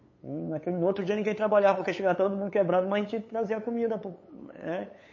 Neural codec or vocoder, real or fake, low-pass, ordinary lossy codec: codec, 16 kHz, 8 kbps, FunCodec, trained on LibriTTS, 25 frames a second; fake; 7.2 kHz; MP3, 32 kbps